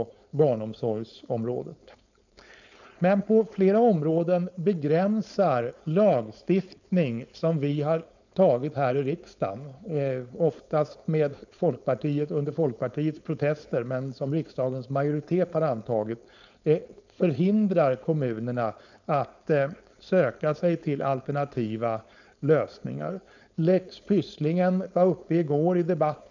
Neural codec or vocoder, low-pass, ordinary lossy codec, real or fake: codec, 16 kHz, 4.8 kbps, FACodec; 7.2 kHz; none; fake